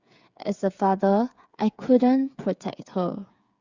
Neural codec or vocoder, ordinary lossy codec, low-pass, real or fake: codec, 16 kHz, 8 kbps, FreqCodec, smaller model; Opus, 64 kbps; 7.2 kHz; fake